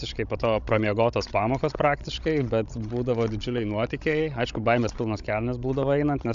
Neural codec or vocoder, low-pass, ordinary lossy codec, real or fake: codec, 16 kHz, 16 kbps, FreqCodec, larger model; 7.2 kHz; AAC, 96 kbps; fake